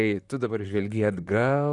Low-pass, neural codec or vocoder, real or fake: 10.8 kHz; vocoder, 44.1 kHz, 128 mel bands, Pupu-Vocoder; fake